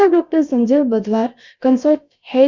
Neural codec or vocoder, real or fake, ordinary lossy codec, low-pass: codec, 16 kHz, about 1 kbps, DyCAST, with the encoder's durations; fake; Opus, 64 kbps; 7.2 kHz